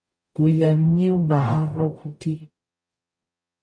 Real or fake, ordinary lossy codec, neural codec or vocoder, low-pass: fake; MP3, 48 kbps; codec, 44.1 kHz, 0.9 kbps, DAC; 9.9 kHz